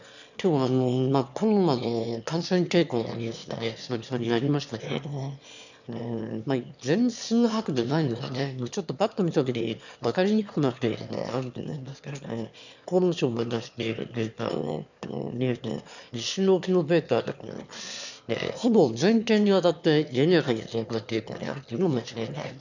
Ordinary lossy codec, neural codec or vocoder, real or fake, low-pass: none; autoencoder, 22.05 kHz, a latent of 192 numbers a frame, VITS, trained on one speaker; fake; 7.2 kHz